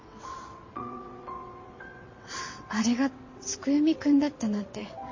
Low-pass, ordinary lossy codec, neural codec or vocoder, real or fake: 7.2 kHz; MP3, 32 kbps; vocoder, 22.05 kHz, 80 mel bands, WaveNeXt; fake